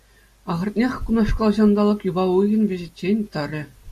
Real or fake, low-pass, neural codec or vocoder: real; 14.4 kHz; none